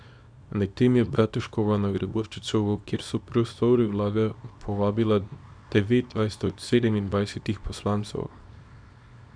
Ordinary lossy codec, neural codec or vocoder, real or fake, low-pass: none; codec, 24 kHz, 0.9 kbps, WavTokenizer, small release; fake; 9.9 kHz